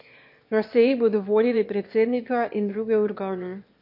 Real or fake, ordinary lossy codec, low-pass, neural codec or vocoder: fake; MP3, 32 kbps; 5.4 kHz; autoencoder, 22.05 kHz, a latent of 192 numbers a frame, VITS, trained on one speaker